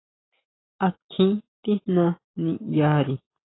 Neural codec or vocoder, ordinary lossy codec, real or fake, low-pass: none; AAC, 16 kbps; real; 7.2 kHz